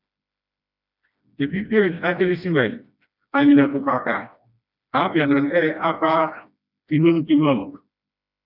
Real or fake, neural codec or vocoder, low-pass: fake; codec, 16 kHz, 1 kbps, FreqCodec, smaller model; 5.4 kHz